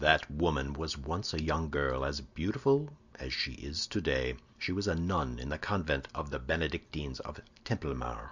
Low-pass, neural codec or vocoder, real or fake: 7.2 kHz; none; real